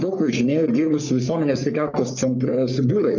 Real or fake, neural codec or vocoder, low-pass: fake; codec, 44.1 kHz, 3.4 kbps, Pupu-Codec; 7.2 kHz